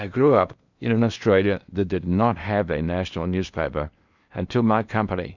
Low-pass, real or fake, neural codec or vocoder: 7.2 kHz; fake; codec, 16 kHz in and 24 kHz out, 0.8 kbps, FocalCodec, streaming, 65536 codes